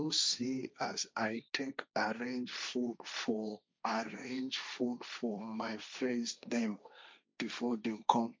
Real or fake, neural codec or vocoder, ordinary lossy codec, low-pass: fake; codec, 16 kHz, 1.1 kbps, Voila-Tokenizer; none; none